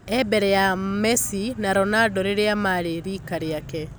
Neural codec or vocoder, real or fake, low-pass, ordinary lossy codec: none; real; none; none